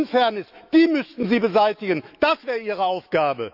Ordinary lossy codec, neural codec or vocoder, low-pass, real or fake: none; autoencoder, 48 kHz, 128 numbers a frame, DAC-VAE, trained on Japanese speech; 5.4 kHz; fake